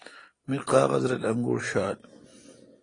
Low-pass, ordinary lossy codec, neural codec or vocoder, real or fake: 9.9 kHz; AAC, 32 kbps; none; real